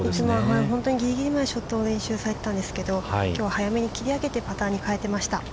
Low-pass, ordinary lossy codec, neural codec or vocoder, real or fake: none; none; none; real